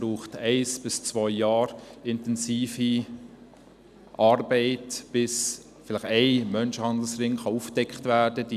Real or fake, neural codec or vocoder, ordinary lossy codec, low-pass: real; none; none; 14.4 kHz